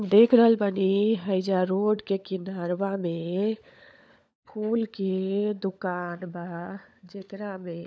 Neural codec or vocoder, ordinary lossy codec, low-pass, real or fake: codec, 16 kHz, 16 kbps, FunCodec, trained on LibriTTS, 50 frames a second; none; none; fake